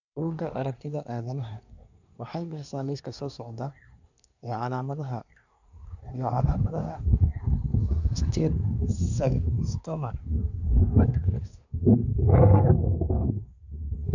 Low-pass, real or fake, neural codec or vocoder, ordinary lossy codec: 7.2 kHz; fake; codec, 24 kHz, 1 kbps, SNAC; none